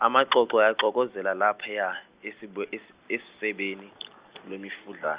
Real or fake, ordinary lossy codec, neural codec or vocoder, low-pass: real; Opus, 64 kbps; none; 3.6 kHz